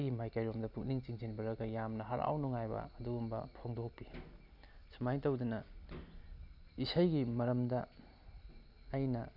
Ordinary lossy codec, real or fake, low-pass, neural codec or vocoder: none; real; 5.4 kHz; none